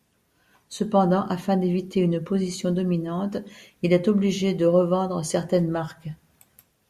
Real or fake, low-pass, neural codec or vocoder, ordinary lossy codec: real; 14.4 kHz; none; Opus, 64 kbps